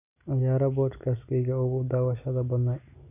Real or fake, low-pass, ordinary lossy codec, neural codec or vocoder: real; 3.6 kHz; none; none